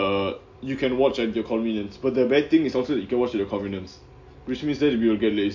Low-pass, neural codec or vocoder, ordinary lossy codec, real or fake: 7.2 kHz; none; MP3, 48 kbps; real